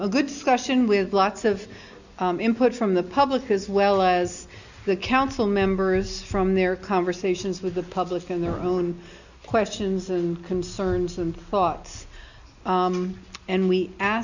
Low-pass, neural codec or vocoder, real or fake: 7.2 kHz; none; real